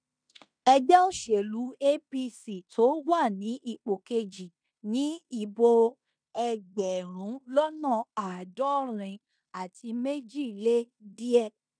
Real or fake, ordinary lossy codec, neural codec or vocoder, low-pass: fake; none; codec, 16 kHz in and 24 kHz out, 0.9 kbps, LongCat-Audio-Codec, fine tuned four codebook decoder; 9.9 kHz